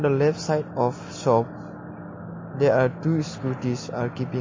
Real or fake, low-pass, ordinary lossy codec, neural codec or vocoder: real; 7.2 kHz; MP3, 32 kbps; none